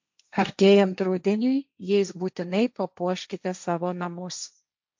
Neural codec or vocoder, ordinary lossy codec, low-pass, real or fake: codec, 16 kHz, 1.1 kbps, Voila-Tokenizer; MP3, 64 kbps; 7.2 kHz; fake